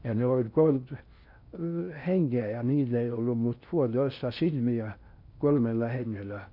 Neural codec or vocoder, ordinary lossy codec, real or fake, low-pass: codec, 16 kHz in and 24 kHz out, 0.6 kbps, FocalCodec, streaming, 4096 codes; none; fake; 5.4 kHz